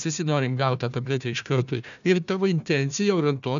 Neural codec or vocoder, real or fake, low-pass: codec, 16 kHz, 1 kbps, FunCodec, trained on Chinese and English, 50 frames a second; fake; 7.2 kHz